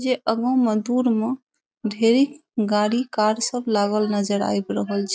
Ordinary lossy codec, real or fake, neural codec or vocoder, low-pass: none; real; none; none